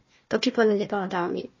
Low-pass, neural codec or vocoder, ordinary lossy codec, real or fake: 7.2 kHz; codec, 16 kHz, 1 kbps, FunCodec, trained on Chinese and English, 50 frames a second; MP3, 32 kbps; fake